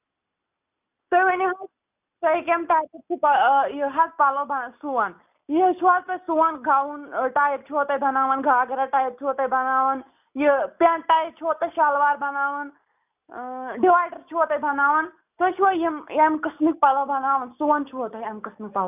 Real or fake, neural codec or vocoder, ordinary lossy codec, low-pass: real; none; none; 3.6 kHz